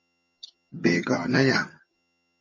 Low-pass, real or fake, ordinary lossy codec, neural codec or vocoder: 7.2 kHz; fake; MP3, 32 kbps; vocoder, 22.05 kHz, 80 mel bands, HiFi-GAN